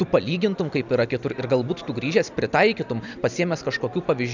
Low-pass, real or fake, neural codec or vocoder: 7.2 kHz; real; none